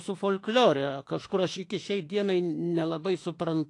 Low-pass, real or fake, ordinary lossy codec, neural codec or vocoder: 10.8 kHz; fake; AAC, 48 kbps; autoencoder, 48 kHz, 32 numbers a frame, DAC-VAE, trained on Japanese speech